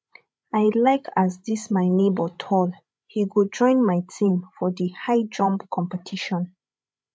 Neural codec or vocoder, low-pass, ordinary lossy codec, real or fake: codec, 16 kHz, 16 kbps, FreqCodec, larger model; none; none; fake